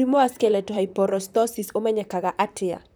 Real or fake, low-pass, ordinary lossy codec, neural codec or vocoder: fake; none; none; vocoder, 44.1 kHz, 128 mel bands, Pupu-Vocoder